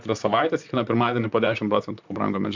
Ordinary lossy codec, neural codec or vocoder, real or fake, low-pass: MP3, 64 kbps; vocoder, 44.1 kHz, 128 mel bands, Pupu-Vocoder; fake; 7.2 kHz